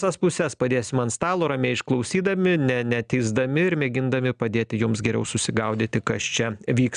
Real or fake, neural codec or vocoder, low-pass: real; none; 9.9 kHz